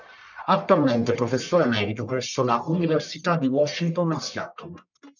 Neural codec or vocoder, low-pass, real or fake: codec, 44.1 kHz, 1.7 kbps, Pupu-Codec; 7.2 kHz; fake